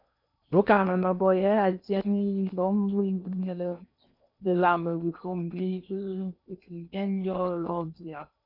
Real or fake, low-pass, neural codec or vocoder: fake; 5.4 kHz; codec, 16 kHz in and 24 kHz out, 0.8 kbps, FocalCodec, streaming, 65536 codes